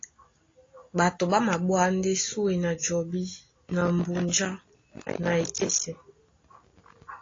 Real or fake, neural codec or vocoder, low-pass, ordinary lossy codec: real; none; 7.2 kHz; AAC, 32 kbps